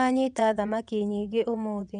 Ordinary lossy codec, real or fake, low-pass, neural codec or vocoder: none; fake; 9.9 kHz; vocoder, 22.05 kHz, 80 mel bands, WaveNeXt